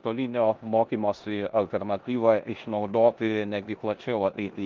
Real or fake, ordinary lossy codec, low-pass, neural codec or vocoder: fake; Opus, 24 kbps; 7.2 kHz; codec, 16 kHz in and 24 kHz out, 0.9 kbps, LongCat-Audio-Codec, four codebook decoder